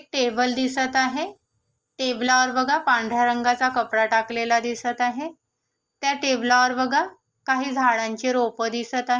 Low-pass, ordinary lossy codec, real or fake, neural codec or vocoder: 7.2 kHz; Opus, 24 kbps; real; none